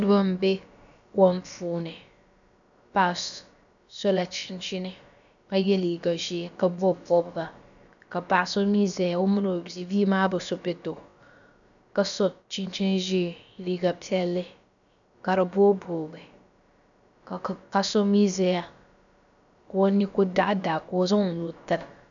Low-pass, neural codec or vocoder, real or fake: 7.2 kHz; codec, 16 kHz, about 1 kbps, DyCAST, with the encoder's durations; fake